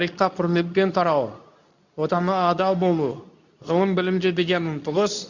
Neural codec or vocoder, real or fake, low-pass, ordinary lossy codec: codec, 24 kHz, 0.9 kbps, WavTokenizer, medium speech release version 2; fake; 7.2 kHz; none